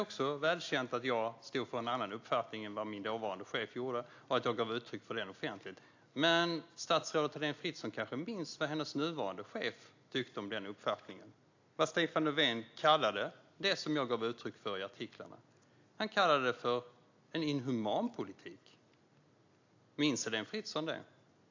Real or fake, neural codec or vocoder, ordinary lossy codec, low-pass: real; none; AAC, 48 kbps; 7.2 kHz